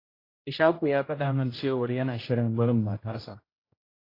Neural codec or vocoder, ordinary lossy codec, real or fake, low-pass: codec, 16 kHz, 0.5 kbps, X-Codec, HuBERT features, trained on general audio; AAC, 24 kbps; fake; 5.4 kHz